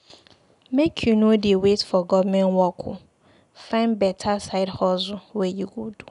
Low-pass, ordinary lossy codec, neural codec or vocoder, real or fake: 10.8 kHz; none; none; real